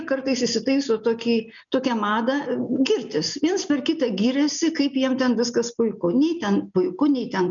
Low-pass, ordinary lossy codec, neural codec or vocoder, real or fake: 7.2 kHz; MP3, 96 kbps; none; real